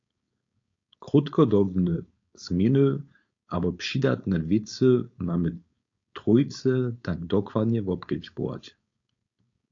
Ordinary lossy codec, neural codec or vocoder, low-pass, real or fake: AAC, 48 kbps; codec, 16 kHz, 4.8 kbps, FACodec; 7.2 kHz; fake